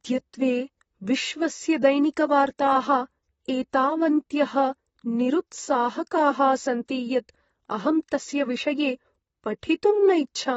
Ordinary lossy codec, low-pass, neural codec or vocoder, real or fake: AAC, 24 kbps; 19.8 kHz; vocoder, 44.1 kHz, 128 mel bands, Pupu-Vocoder; fake